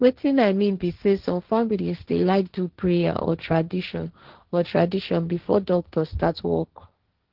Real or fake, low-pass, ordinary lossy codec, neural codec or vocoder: fake; 5.4 kHz; Opus, 16 kbps; codec, 16 kHz, 1.1 kbps, Voila-Tokenizer